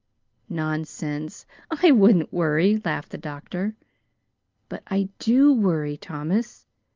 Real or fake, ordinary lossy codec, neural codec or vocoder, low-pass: real; Opus, 24 kbps; none; 7.2 kHz